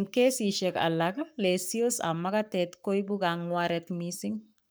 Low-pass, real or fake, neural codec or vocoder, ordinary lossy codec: none; fake; codec, 44.1 kHz, 7.8 kbps, Pupu-Codec; none